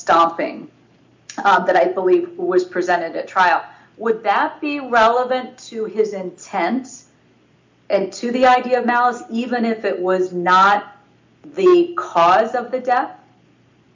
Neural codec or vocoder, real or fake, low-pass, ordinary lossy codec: none; real; 7.2 kHz; MP3, 64 kbps